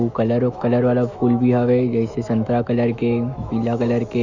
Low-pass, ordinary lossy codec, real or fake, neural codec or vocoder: 7.2 kHz; none; fake; autoencoder, 48 kHz, 128 numbers a frame, DAC-VAE, trained on Japanese speech